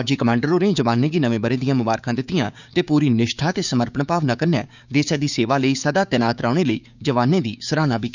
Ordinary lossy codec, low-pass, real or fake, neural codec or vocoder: none; 7.2 kHz; fake; codec, 16 kHz, 6 kbps, DAC